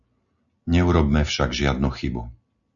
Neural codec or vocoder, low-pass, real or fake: none; 7.2 kHz; real